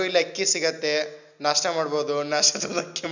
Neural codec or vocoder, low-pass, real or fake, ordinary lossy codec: none; 7.2 kHz; real; none